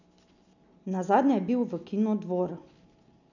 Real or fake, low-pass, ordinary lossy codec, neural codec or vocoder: real; 7.2 kHz; none; none